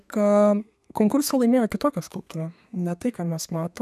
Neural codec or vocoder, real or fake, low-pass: codec, 32 kHz, 1.9 kbps, SNAC; fake; 14.4 kHz